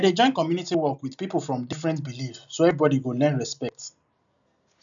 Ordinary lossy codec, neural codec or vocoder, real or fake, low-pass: none; none; real; 7.2 kHz